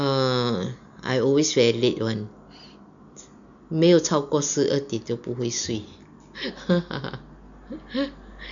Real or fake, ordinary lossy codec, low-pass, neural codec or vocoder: real; none; 7.2 kHz; none